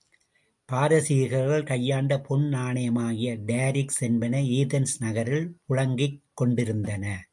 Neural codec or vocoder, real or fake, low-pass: none; real; 10.8 kHz